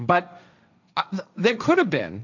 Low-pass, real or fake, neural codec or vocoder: 7.2 kHz; fake; codec, 16 kHz, 1.1 kbps, Voila-Tokenizer